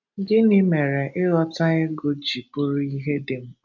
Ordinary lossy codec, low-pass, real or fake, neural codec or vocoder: none; 7.2 kHz; real; none